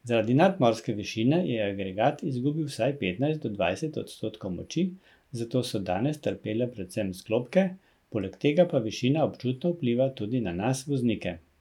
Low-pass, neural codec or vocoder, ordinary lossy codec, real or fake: 19.8 kHz; none; none; real